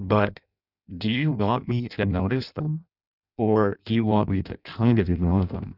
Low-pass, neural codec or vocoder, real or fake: 5.4 kHz; codec, 16 kHz in and 24 kHz out, 0.6 kbps, FireRedTTS-2 codec; fake